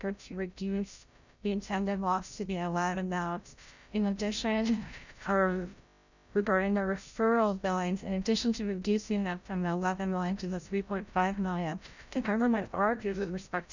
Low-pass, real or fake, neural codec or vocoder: 7.2 kHz; fake; codec, 16 kHz, 0.5 kbps, FreqCodec, larger model